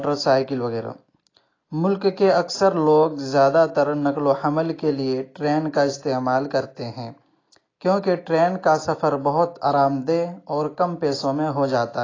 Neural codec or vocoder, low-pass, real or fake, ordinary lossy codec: none; 7.2 kHz; real; AAC, 32 kbps